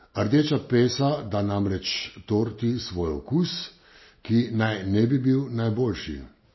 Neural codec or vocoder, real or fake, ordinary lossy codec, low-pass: none; real; MP3, 24 kbps; 7.2 kHz